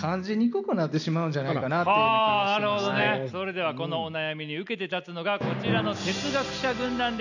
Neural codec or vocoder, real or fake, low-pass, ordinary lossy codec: none; real; 7.2 kHz; none